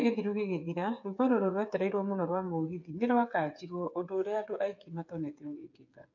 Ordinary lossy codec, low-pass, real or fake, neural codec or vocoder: MP3, 48 kbps; 7.2 kHz; fake; codec, 16 kHz, 8 kbps, FreqCodec, smaller model